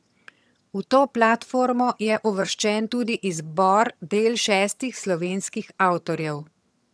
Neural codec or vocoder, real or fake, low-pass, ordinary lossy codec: vocoder, 22.05 kHz, 80 mel bands, HiFi-GAN; fake; none; none